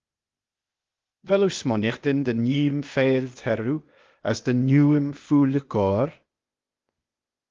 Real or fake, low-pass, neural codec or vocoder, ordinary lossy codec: fake; 7.2 kHz; codec, 16 kHz, 0.8 kbps, ZipCodec; Opus, 32 kbps